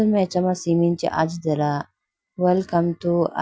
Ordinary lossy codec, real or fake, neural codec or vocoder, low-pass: none; real; none; none